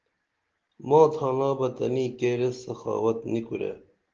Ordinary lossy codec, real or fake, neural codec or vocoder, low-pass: Opus, 16 kbps; real; none; 7.2 kHz